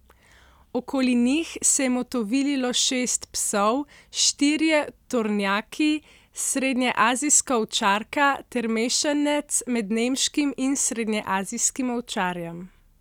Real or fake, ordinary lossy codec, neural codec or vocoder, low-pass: real; none; none; 19.8 kHz